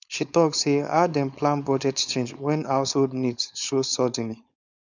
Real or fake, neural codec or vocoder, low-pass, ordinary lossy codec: fake; codec, 16 kHz, 4.8 kbps, FACodec; 7.2 kHz; none